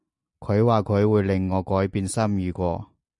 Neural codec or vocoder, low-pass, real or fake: none; 10.8 kHz; real